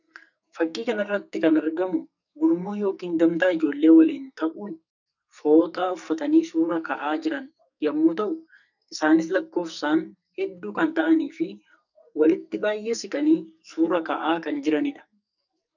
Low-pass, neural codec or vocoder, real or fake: 7.2 kHz; codec, 44.1 kHz, 2.6 kbps, SNAC; fake